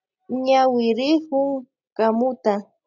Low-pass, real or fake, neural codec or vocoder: 7.2 kHz; real; none